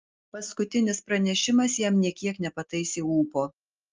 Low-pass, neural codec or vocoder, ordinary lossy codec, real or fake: 7.2 kHz; none; Opus, 24 kbps; real